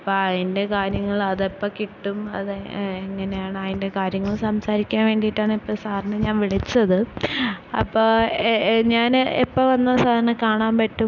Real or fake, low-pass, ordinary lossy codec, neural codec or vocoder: real; 7.2 kHz; none; none